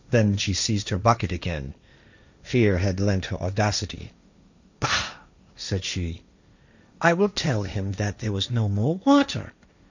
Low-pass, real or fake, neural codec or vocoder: 7.2 kHz; fake; codec, 16 kHz, 1.1 kbps, Voila-Tokenizer